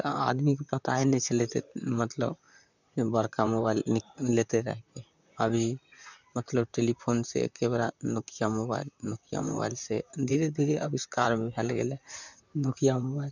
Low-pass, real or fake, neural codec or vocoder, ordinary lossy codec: 7.2 kHz; fake; vocoder, 44.1 kHz, 128 mel bands, Pupu-Vocoder; none